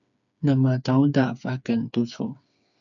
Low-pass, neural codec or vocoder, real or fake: 7.2 kHz; codec, 16 kHz, 4 kbps, FreqCodec, smaller model; fake